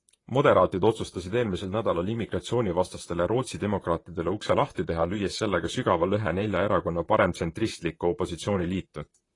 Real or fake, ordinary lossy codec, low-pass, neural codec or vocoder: fake; AAC, 32 kbps; 10.8 kHz; vocoder, 44.1 kHz, 128 mel bands, Pupu-Vocoder